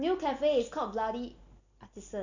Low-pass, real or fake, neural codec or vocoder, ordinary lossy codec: 7.2 kHz; real; none; none